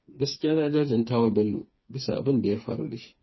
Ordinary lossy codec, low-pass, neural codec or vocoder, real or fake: MP3, 24 kbps; 7.2 kHz; codec, 16 kHz, 4 kbps, FreqCodec, smaller model; fake